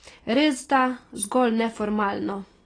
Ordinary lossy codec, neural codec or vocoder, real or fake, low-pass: AAC, 32 kbps; none; real; 9.9 kHz